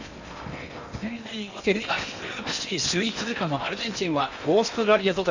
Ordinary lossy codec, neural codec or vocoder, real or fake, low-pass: none; codec, 16 kHz in and 24 kHz out, 0.8 kbps, FocalCodec, streaming, 65536 codes; fake; 7.2 kHz